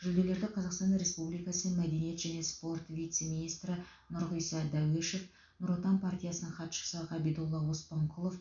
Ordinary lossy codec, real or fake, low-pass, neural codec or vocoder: MP3, 64 kbps; real; 7.2 kHz; none